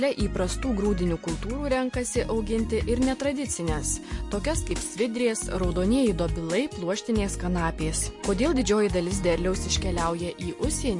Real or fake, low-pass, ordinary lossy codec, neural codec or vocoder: real; 10.8 kHz; MP3, 48 kbps; none